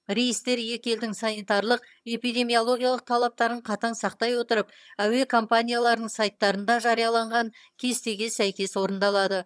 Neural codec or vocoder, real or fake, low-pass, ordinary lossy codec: vocoder, 22.05 kHz, 80 mel bands, HiFi-GAN; fake; none; none